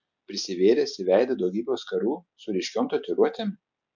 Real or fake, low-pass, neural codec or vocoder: real; 7.2 kHz; none